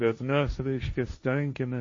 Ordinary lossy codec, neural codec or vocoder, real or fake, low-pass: MP3, 32 kbps; codec, 16 kHz, 1.1 kbps, Voila-Tokenizer; fake; 7.2 kHz